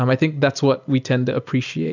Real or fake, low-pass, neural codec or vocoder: real; 7.2 kHz; none